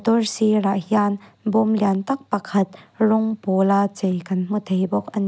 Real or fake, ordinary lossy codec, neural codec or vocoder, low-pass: real; none; none; none